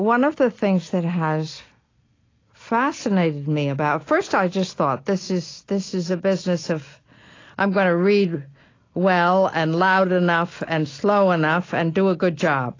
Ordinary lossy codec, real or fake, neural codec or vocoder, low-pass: AAC, 32 kbps; real; none; 7.2 kHz